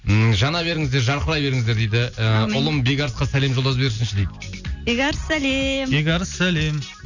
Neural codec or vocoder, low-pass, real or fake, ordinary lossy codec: none; 7.2 kHz; real; none